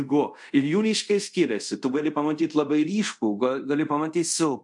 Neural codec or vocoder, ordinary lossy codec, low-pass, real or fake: codec, 24 kHz, 0.5 kbps, DualCodec; MP3, 64 kbps; 10.8 kHz; fake